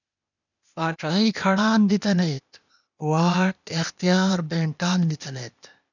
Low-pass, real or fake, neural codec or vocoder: 7.2 kHz; fake; codec, 16 kHz, 0.8 kbps, ZipCodec